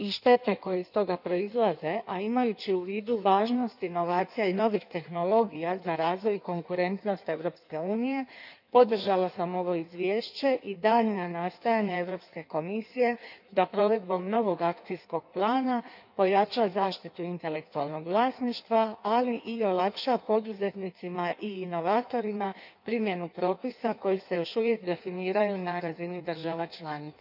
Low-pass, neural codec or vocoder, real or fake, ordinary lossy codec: 5.4 kHz; codec, 16 kHz in and 24 kHz out, 1.1 kbps, FireRedTTS-2 codec; fake; none